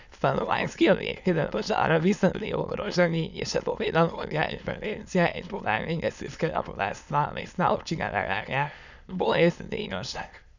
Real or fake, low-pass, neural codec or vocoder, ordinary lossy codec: fake; 7.2 kHz; autoencoder, 22.05 kHz, a latent of 192 numbers a frame, VITS, trained on many speakers; none